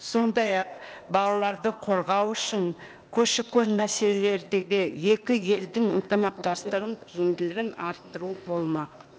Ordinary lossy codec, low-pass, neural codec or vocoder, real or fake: none; none; codec, 16 kHz, 0.8 kbps, ZipCodec; fake